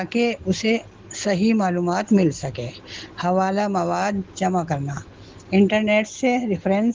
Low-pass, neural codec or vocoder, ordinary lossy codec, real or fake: 7.2 kHz; none; Opus, 16 kbps; real